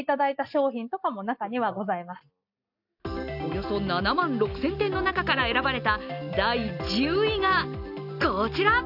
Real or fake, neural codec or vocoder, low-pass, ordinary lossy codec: real; none; 5.4 kHz; AAC, 48 kbps